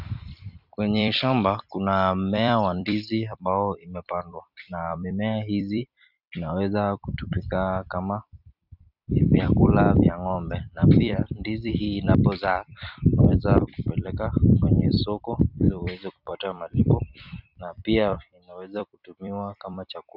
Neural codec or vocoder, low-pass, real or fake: vocoder, 44.1 kHz, 128 mel bands every 256 samples, BigVGAN v2; 5.4 kHz; fake